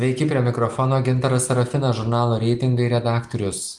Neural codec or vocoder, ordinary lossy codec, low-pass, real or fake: none; Opus, 24 kbps; 10.8 kHz; real